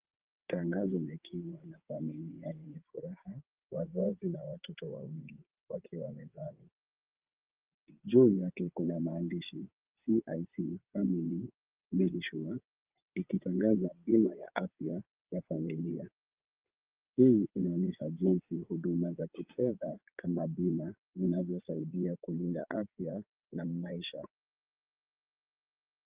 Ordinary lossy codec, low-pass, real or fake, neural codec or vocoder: Opus, 24 kbps; 3.6 kHz; fake; vocoder, 22.05 kHz, 80 mel bands, WaveNeXt